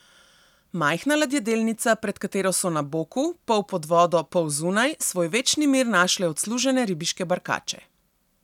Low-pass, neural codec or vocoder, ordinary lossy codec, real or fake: 19.8 kHz; none; none; real